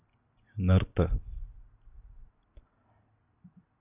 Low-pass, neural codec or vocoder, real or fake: 3.6 kHz; none; real